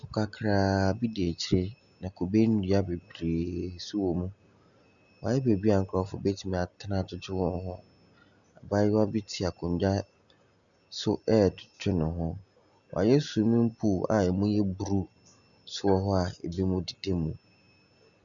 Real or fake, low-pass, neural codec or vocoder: real; 7.2 kHz; none